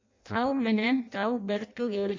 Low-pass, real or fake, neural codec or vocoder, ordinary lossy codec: 7.2 kHz; fake; codec, 16 kHz in and 24 kHz out, 0.6 kbps, FireRedTTS-2 codec; MP3, 48 kbps